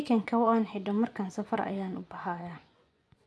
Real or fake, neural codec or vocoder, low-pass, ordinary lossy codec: fake; vocoder, 24 kHz, 100 mel bands, Vocos; none; none